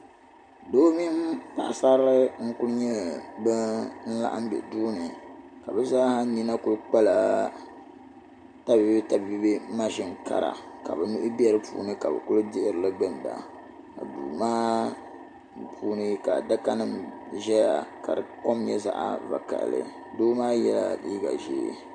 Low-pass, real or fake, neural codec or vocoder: 9.9 kHz; real; none